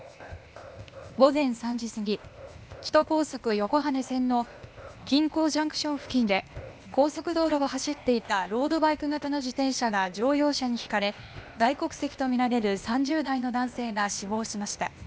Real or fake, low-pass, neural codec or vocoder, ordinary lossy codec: fake; none; codec, 16 kHz, 0.8 kbps, ZipCodec; none